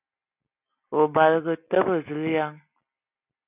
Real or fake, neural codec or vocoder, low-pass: real; none; 3.6 kHz